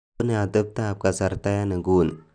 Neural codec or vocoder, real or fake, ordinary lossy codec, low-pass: none; real; none; 9.9 kHz